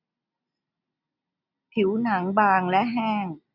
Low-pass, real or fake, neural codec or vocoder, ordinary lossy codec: 5.4 kHz; real; none; none